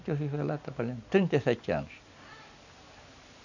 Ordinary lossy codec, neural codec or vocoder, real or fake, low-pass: none; none; real; 7.2 kHz